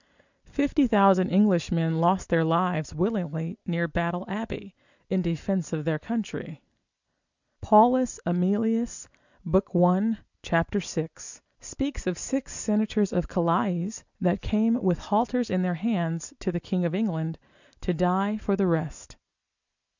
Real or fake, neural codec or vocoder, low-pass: real; none; 7.2 kHz